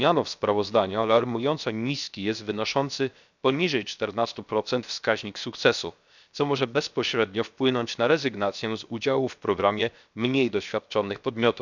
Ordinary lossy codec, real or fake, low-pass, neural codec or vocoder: none; fake; 7.2 kHz; codec, 16 kHz, 0.7 kbps, FocalCodec